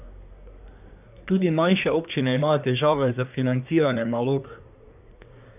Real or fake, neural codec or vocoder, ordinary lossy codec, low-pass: fake; codec, 24 kHz, 1 kbps, SNAC; none; 3.6 kHz